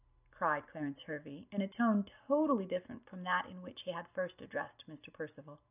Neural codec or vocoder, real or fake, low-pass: none; real; 3.6 kHz